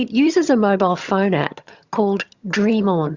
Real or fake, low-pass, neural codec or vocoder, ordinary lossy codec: fake; 7.2 kHz; vocoder, 22.05 kHz, 80 mel bands, HiFi-GAN; Opus, 64 kbps